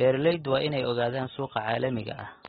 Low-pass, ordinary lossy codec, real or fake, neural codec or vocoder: 7.2 kHz; AAC, 16 kbps; real; none